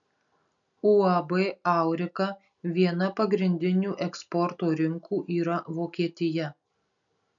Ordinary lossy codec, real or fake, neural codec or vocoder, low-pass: MP3, 96 kbps; real; none; 7.2 kHz